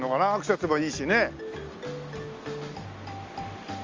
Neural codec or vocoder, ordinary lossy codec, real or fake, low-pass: none; Opus, 32 kbps; real; 7.2 kHz